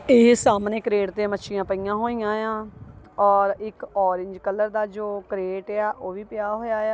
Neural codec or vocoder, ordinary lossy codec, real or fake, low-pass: none; none; real; none